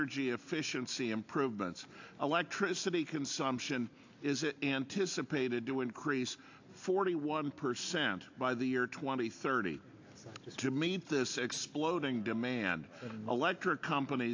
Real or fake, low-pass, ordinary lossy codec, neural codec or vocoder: real; 7.2 kHz; AAC, 48 kbps; none